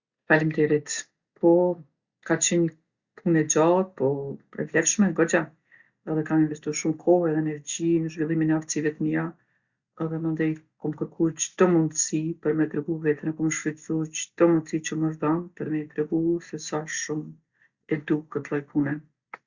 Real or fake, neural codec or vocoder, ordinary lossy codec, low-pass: real; none; Opus, 64 kbps; 7.2 kHz